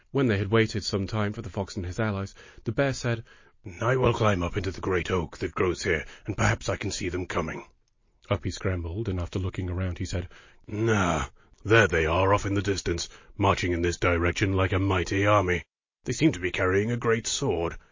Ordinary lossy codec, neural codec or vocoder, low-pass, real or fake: MP3, 32 kbps; none; 7.2 kHz; real